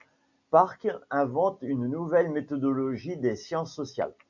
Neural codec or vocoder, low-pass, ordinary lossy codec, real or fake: none; 7.2 kHz; MP3, 64 kbps; real